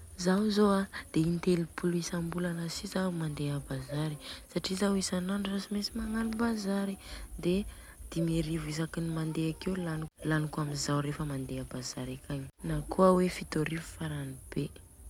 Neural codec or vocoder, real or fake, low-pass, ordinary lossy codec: none; real; 19.8 kHz; MP3, 96 kbps